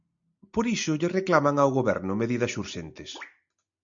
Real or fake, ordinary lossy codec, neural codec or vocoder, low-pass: real; MP3, 64 kbps; none; 7.2 kHz